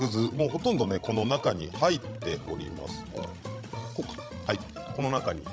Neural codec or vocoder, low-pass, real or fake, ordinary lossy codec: codec, 16 kHz, 16 kbps, FreqCodec, larger model; none; fake; none